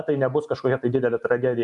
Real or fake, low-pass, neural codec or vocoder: real; 10.8 kHz; none